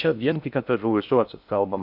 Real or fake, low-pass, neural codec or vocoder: fake; 5.4 kHz; codec, 16 kHz in and 24 kHz out, 0.6 kbps, FocalCodec, streaming, 2048 codes